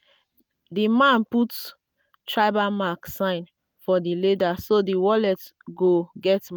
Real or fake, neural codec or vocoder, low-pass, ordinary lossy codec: real; none; none; none